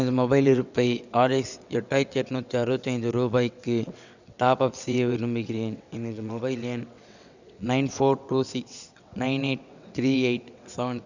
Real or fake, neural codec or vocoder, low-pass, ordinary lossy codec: fake; vocoder, 22.05 kHz, 80 mel bands, WaveNeXt; 7.2 kHz; none